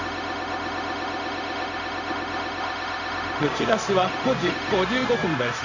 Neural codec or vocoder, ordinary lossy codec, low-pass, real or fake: codec, 16 kHz, 0.4 kbps, LongCat-Audio-Codec; none; 7.2 kHz; fake